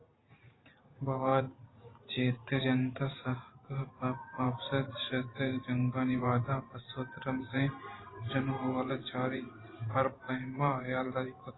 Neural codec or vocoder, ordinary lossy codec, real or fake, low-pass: vocoder, 44.1 kHz, 128 mel bands every 512 samples, BigVGAN v2; AAC, 16 kbps; fake; 7.2 kHz